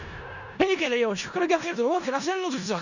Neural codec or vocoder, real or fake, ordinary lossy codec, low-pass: codec, 16 kHz in and 24 kHz out, 0.4 kbps, LongCat-Audio-Codec, four codebook decoder; fake; none; 7.2 kHz